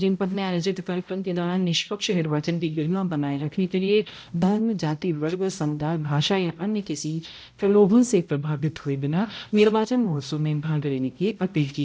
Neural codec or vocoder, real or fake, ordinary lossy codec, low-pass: codec, 16 kHz, 0.5 kbps, X-Codec, HuBERT features, trained on balanced general audio; fake; none; none